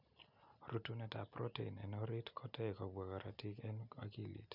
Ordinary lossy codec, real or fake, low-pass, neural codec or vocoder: none; real; 5.4 kHz; none